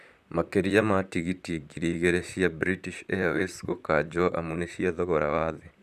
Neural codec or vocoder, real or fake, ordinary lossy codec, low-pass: vocoder, 44.1 kHz, 128 mel bands, Pupu-Vocoder; fake; none; 14.4 kHz